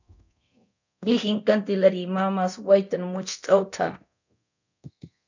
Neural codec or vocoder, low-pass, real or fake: codec, 24 kHz, 0.9 kbps, DualCodec; 7.2 kHz; fake